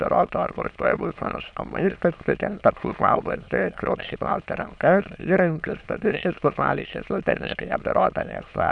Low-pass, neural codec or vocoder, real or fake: 9.9 kHz; autoencoder, 22.05 kHz, a latent of 192 numbers a frame, VITS, trained on many speakers; fake